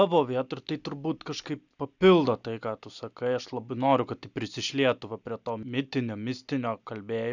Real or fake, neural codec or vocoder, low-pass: real; none; 7.2 kHz